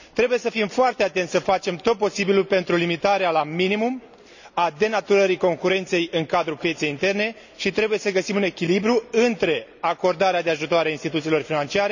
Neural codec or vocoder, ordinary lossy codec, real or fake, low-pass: none; none; real; 7.2 kHz